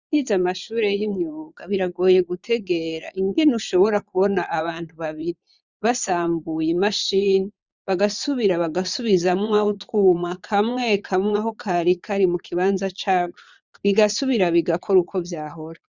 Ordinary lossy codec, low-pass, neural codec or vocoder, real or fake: Opus, 64 kbps; 7.2 kHz; vocoder, 22.05 kHz, 80 mel bands, Vocos; fake